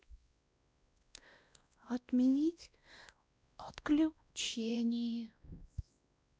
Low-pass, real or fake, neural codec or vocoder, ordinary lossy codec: none; fake; codec, 16 kHz, 1 kbps, X-Codec, WavLM features, trained on Multilingual LibriSpeech; none